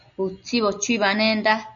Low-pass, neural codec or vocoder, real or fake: 7.2 kHz; none; real